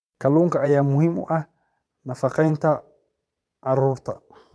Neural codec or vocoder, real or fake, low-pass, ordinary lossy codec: vocoder, 22.05 kHz, 80 mel bands, Vocos; fake; none; none